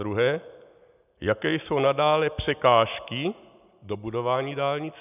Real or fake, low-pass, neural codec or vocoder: real; 3.6 kHz; none